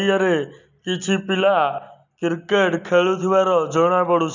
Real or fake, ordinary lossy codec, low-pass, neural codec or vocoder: real; none; 7.2 kHz; none